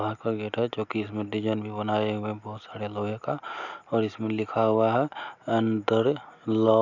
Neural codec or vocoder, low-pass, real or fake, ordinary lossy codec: none; 7.2 kHz; real; none